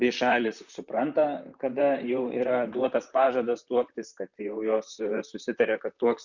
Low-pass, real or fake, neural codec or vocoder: 7.2 kHz; fake; vocoder, 44.1 kHz, 128 mel bands, Pupu-Vocoder